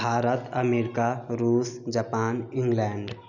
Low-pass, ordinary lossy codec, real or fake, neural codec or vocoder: 7.2 kHz; none; real; none